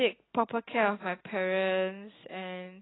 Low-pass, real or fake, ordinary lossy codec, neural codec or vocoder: 7.2 kHz; real; AAC, 16 kbps; none